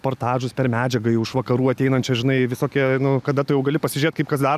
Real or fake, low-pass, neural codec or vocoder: real; 14.4 kHz; none